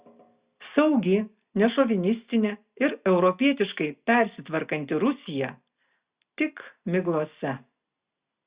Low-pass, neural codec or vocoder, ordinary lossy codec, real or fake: 3.6 kHz; none; Opus, 64 kbps; real